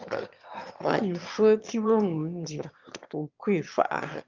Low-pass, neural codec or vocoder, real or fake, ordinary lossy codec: 7.2 kHz; autoencoder, 22.05 kHz, a latent of 192 numbers a frame, VITS, trained on one speaker; fake; Opus, 24 kbps